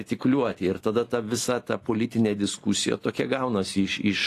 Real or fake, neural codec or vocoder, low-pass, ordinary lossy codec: real; none; 14.4 kHz; AAC, 48 kbps